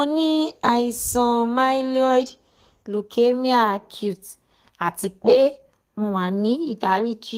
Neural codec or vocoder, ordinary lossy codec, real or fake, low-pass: codec, 32 kHz, 1.9 kbps, SNAC; Opus, 32 kbps; fake; 14.4 kHz